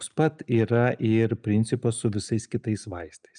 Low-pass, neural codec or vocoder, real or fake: 9.9 kHz; none; real